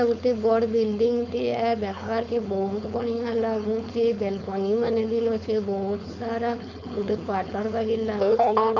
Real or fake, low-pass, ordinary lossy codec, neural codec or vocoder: fake; 7.2 kHz; none; codec, 16 kHz, 4.8 kbps, FACodec